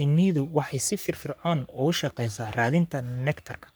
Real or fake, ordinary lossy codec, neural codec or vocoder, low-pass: fake; none; codec, 44.1 kHz, 3.4 kbps, Pupu-Codec; none